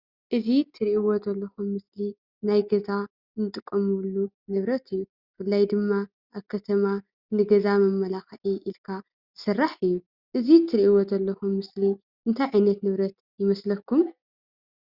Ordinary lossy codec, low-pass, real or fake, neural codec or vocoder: Opus, 64 kbps; 5.4 kHz; real; none